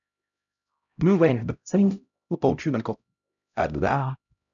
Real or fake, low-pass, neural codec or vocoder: fake; 7.2 kHz; codec, 16 kHz, 0.5 kbps, X-Codec, HuBERT features, trained on LibriSpeech